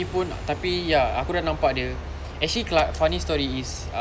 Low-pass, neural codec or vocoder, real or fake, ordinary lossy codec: none; none; real; none